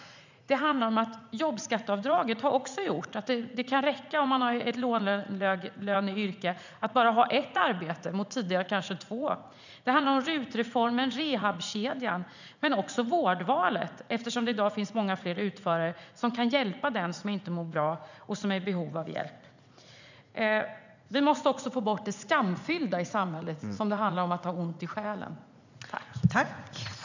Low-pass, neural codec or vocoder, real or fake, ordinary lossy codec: 7.2 kHz; vocoder, 44.1 kHz, 80 mel bands, Vocos; fake; none